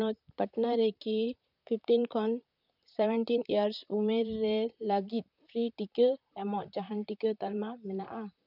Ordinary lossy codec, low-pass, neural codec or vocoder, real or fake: none; 5.4 kHz; vocoder, 44.1 kHz, 128 mel bands, Pupu-Vocoder; fake